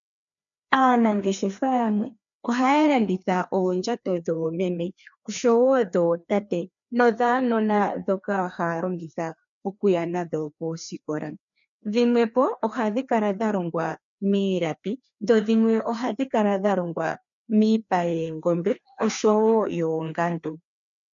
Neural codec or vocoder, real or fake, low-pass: codec, 16 kHz, 2 kbps, FreqCodec, larger model; fake; 7.2 kHz